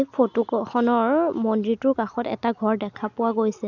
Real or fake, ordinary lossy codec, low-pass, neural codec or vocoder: real; none; 7.2 kHz; none